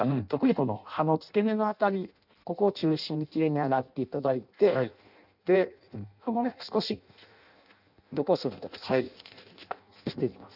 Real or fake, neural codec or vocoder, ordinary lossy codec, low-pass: fake; codec, 16 kHz in and 24 kHz out, 0.6 kbps, FireRedTTS-2 codec; none; 5.4 kHz